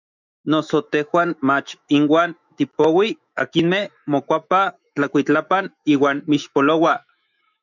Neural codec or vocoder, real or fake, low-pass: autoencoder, 48 kHz, 128 numbers a frame, DAC-VAE, trained on Japanese speech; fake; 7.2 kHz